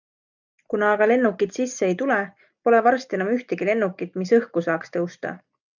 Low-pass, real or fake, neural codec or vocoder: 7.2 kHz; real; none